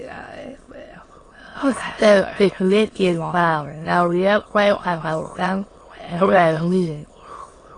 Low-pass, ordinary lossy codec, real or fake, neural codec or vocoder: 9.9 kHz; AAC, 32 kbps; fake; autoencoder, 22.05 kHz, a latent of 192 numbers a frame, VITS, trained on many speakers